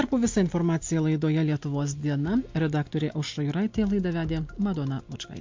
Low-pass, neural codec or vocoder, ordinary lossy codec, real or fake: 7.2 kHz; none; MP3, 48 kbps; real